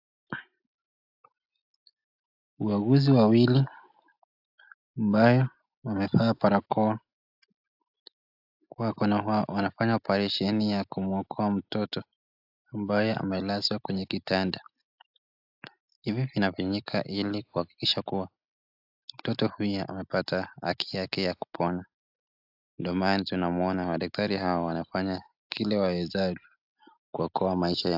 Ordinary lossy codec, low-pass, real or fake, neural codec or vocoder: AAC, 48 kbps; 5.4 kHz; real; none